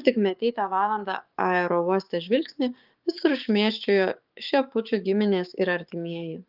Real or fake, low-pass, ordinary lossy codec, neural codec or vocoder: fake; 5.4 kHz; Opus, 32 kbps; codec, 16 kHz, 6 kbps, DAC